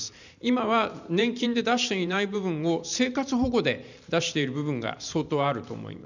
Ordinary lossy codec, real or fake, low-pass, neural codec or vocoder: none; fake; 7.2 kHz; vocoder, 44.1 kHz, 128 mel bands every 512 samples, BigVGAN v2